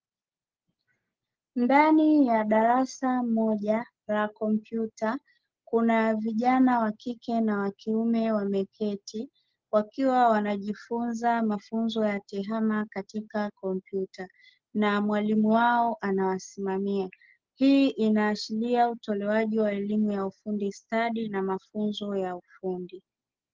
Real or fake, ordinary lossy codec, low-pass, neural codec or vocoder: real; Opus, 16 kbps; 7.2 kHz; none